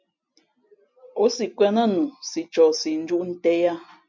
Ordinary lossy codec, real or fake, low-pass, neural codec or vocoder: MP3, 64 kbps; real; 7.2 kHz; none